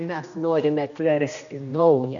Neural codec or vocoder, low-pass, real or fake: codec, 16 kHz, 1 kbps, X-Codec, HuBERT features, trained on general audio; 7.2 kHz; fake